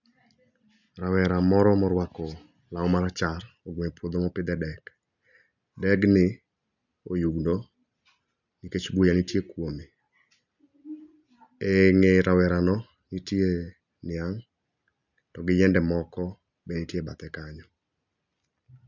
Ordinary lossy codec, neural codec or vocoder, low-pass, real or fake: none; none; 7.2 kHz; real